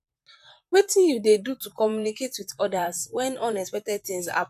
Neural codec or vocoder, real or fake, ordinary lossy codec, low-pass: vocoder, 44.1 kHz, 128 mel bands, Pupu-Vocoder; fake; none; 14.4 kHz